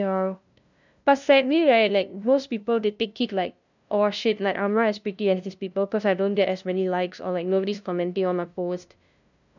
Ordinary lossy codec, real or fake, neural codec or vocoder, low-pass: none; fake; codec, 16 kHz, 0.5 kbps, FunCodec, trained on LibriTTS, 25 frames a second; 7.2 kHz